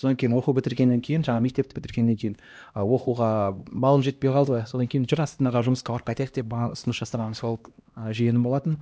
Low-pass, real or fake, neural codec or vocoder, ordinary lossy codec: none; fake; codec, 16 kHz, 1 kbps, X-Codec, HuBERT features, trained on LibriSpeech; none